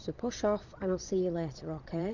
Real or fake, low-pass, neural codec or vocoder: fake; 7.2 kHz; vocoder, 22.05 kHz, 80 mel bands, Vocos